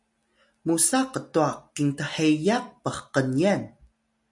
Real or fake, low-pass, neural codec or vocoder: real; 10.8 kHz; none